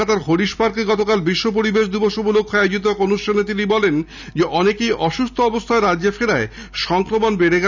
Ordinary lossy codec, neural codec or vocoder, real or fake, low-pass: none; none; real; 7.2 kHz